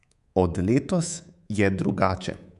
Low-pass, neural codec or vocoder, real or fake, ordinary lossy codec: 10.8 kHz; codec, 24 kHz, 3.1 kbps, DualCodec; fake; none